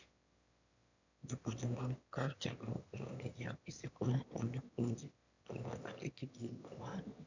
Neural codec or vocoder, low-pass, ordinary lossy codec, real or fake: autoencoder, 22.05 kHz, a latent of 192 numbers a frame, VITS, trained on one speaker; 7.2 kHz; none; fake